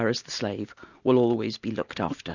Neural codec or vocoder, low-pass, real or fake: none; 7.2 kHz; real